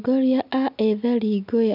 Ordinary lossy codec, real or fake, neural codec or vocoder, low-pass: none; real; none; 5.4 kHz